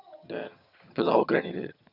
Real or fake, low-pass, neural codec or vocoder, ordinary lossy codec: fake; 5.4 kHz; vocoder, 22.05 kHz, 80 mel bands, HiFi-GAN; none